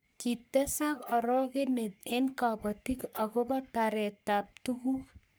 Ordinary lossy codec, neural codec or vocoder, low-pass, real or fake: none; codec, 44.1 kHz, 3.4 kbps, Pupu-Codec; none; fake